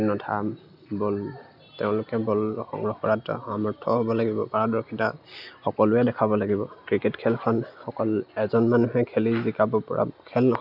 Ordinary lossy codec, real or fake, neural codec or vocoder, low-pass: none; real; none; 5.4 kHz